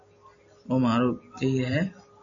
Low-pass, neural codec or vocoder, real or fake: 7.2 kHz; none; real